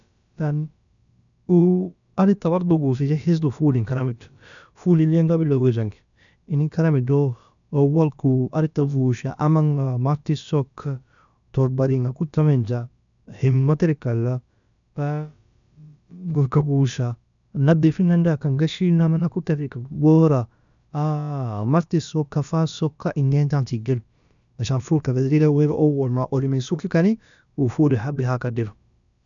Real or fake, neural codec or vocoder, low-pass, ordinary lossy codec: fake; codec, 16 kHz, about 1 kbps, DyCAST, with the encoder's durations; 7.2 kHz; none